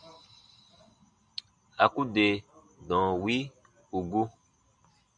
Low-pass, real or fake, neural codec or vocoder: 9.9 kHz; real; none